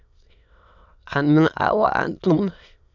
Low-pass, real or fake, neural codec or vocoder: 7.2 kHz; fake; autoencoder, 22.05 kHz, a latent of 192 numbers a frame, VITS, trained on many speakers